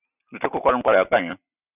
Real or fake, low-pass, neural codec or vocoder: real; 3.6 kHz; none